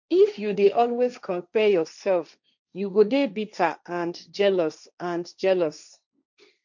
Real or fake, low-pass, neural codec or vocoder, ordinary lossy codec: fake; 7.2 kHz; codec, 16 kHz, 1.1 kbps, Voila-Tokenizer; none